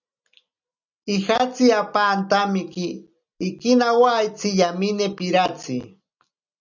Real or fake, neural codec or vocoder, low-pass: real; none; 7.2 kHz